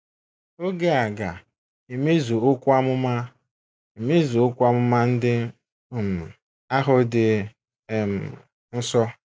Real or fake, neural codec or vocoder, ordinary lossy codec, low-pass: real; none; none; none